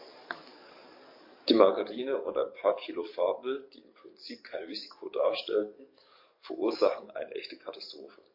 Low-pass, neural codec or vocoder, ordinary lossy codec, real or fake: 5.4 kHz; codec, 44.1 kHz, 7.8 kbps, DAC; MP3, 32 kbps; fake